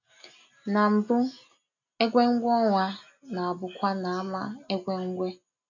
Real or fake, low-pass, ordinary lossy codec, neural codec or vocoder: real; 7.2 kHz; none; none